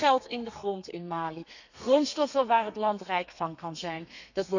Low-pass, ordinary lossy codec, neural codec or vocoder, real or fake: 7.2 kHz; none; codec, 32 kHz, 1.9 kbps, SNAC; fake